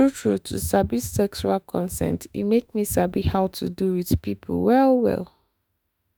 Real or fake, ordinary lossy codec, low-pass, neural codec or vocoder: fake; none; none; autoencoder, 48 kHz, 32 numbers a frame, DAC-VAE, trained on Japanese speech